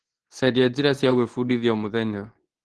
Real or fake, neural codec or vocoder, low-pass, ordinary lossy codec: fake; codec, 24 kHz, 0.9 kbps, WavTokenizer, medium speech release version 2; 10.8 kHz; Opus, 16 kbps